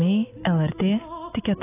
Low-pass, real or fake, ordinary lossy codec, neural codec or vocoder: 3.6 kHz; real; AAC, 16 kbps; none